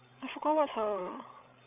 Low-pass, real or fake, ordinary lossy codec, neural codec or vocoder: 3.6 kHz; fake; none; codec, 16 kHz, 16 kbps, FreqCodec, larger model